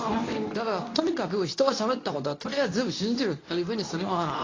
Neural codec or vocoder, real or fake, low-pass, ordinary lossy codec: codec, 24 kHz, 0.9 kbps, WavTokenizer, medium speech release version 2; fake; 7.2 kHz; AAC, 32 kbps